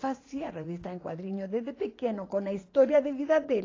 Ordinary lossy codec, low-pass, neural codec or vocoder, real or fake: AAC, 32 kbps; 7.2 kHz; vocoder, 44.1 kHz, 80 mel bands, Vocos; fake